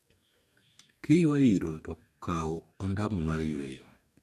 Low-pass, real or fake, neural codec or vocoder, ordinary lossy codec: 14.4 kHz; fake; codec, 44.1 kHz, 2.6 kbps, DAC; none